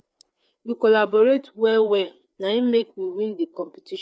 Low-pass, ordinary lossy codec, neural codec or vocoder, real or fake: none; none; codec, 16 kHz, 4 kbps, FreqCodec, larger model; fake